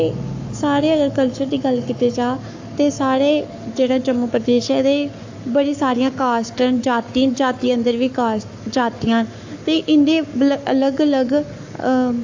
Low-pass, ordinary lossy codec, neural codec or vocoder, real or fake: 7.2 kHz; AAC, 48 kbps; codec, 44.1 kHz, 7.8 kbps, Pupu-Codec; fake